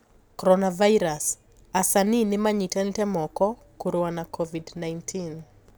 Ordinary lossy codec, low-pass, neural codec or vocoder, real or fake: none; none; none; real